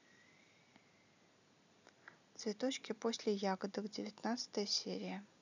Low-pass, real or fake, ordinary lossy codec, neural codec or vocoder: 7.2 kHz; real; none; none